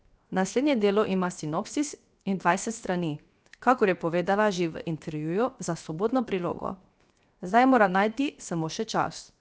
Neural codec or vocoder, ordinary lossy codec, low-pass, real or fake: codec, 16 kHz, 0.7 kbps, FocalCodec; none; none; fake